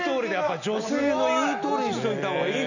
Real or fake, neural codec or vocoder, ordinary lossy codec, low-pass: real; none; none; 7.2 kHz